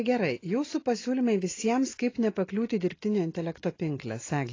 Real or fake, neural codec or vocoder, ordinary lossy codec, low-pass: real; none; AAC, 32 kbps; 7.2 kHz